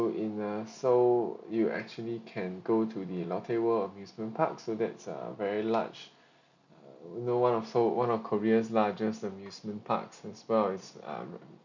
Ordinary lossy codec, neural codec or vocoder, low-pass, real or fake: none; none; 7.2 kHz; real